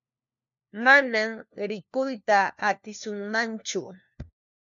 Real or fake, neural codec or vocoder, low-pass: fake; codec, 16 kHz, 1 kbps, FunCodec, trained on LibriTTS, 50 frames a second; 7.2 kHz